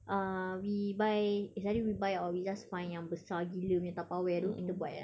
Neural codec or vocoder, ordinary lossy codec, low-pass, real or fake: none; none; none; real